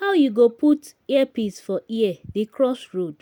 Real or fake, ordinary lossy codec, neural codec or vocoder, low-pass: real; none; none; none